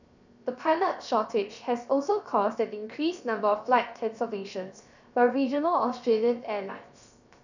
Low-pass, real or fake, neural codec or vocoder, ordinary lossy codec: 7.2 kHz; fake; codec, 16 kHz, 0.7 kbps, FocalCodec; none